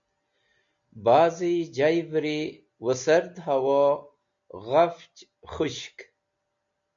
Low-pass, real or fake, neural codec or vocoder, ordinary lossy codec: 7.2 kHz; real; none; MP3, 64 kbps